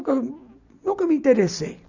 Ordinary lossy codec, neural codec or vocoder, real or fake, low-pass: none; vocoder, 44.1 kHz, 128 mel bands every 256 samples, BigVGAN v2; fake; 7.2 kHz